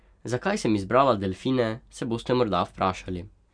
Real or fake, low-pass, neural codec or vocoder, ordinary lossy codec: real; 9.9 kHz; none; none